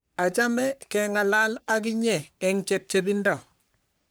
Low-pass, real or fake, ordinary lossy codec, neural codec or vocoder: none; fake; none; codec, 44.1 kHz, 3.4 kbps, Pupu-Codec